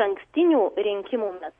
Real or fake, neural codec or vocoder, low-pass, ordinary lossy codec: real; none; 10.8 kHz; MP3, 48 kbps